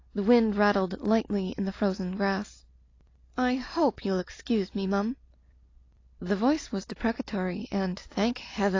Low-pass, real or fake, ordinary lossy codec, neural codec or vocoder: 7.2 kHz; real; AAC, 32 kbps; none